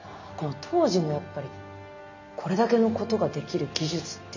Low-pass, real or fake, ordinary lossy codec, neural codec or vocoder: 7.2 kHz; real; none; none